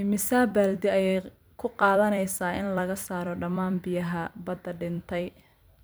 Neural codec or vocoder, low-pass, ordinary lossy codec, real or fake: vocoder, 44.1 kHz, 128 mel bands every 256 samples, BigVGAN v2; none; none; fake